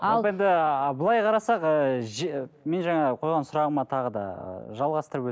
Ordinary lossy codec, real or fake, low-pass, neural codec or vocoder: none; real; none; none